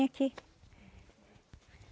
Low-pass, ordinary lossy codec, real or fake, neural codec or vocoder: none; none; real; none